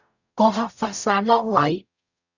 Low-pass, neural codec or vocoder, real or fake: 7.2 kHz; codec, 44.1 kHz, 0.9 kbps, DAC; fake